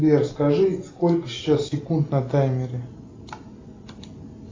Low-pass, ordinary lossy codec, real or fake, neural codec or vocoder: 7.2 kHz; AAC, 32 kbps; real; none